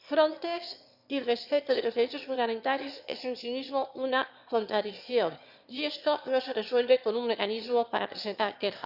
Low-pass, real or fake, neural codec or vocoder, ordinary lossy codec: 5.4 kHz; fake; autoencoder, 22.05 kHz, a latent of 192 numbers a frame, VITS, trained on one speaker; Opus, 64 kbps